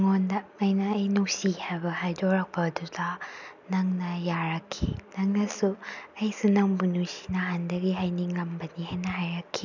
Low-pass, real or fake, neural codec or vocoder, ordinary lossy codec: 7.2 kHz; real; none; none